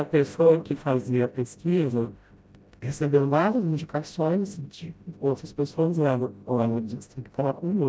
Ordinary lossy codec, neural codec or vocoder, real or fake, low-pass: none; codec, 16 kHz, 0.5 kbps, FreqCodec, smaller model; fake; none